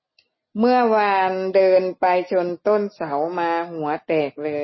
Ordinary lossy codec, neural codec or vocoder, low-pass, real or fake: MP3, 24 kbps; none; 7.2 kHz; real